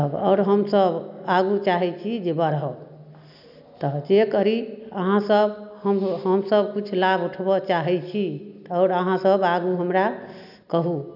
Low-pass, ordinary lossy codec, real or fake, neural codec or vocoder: 5.4 kHz; none; real; none